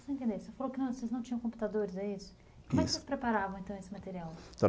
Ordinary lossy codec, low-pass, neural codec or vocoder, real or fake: none; none; none; real